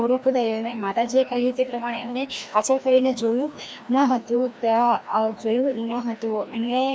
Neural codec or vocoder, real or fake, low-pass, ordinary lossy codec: codec, 16 kHz, 1 kbps, FreqCodec, larger model; fake; none; none